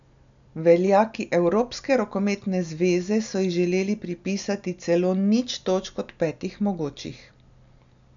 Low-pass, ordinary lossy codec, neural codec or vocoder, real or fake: 7.2 kHz; none; none; real